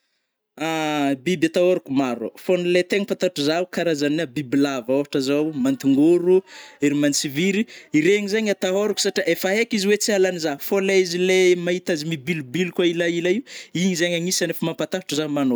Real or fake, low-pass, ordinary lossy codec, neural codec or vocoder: real; none; none; none